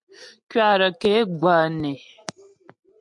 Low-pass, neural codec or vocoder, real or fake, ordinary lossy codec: 10.8 kHz; none; real; MP3, 64 kbps